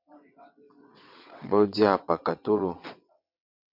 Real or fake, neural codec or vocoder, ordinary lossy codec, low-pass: fake; vocoder, 22.05 kHz, 80 mel bands, WaveNeXt; MP3, 48 kbps; 5.4 kHz